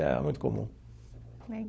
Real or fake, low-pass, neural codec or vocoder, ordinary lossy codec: fake; none; codec, 16 kHz, 16 kbps, FunCodec, trained on LibriTTS, 50 frames a second; none